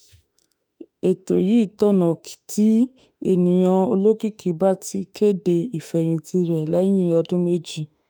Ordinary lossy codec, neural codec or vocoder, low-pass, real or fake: none; autoencoder, 48 kHz, 32 numbers a frame, DAC-VAE, trained on Japanese speech; none; fake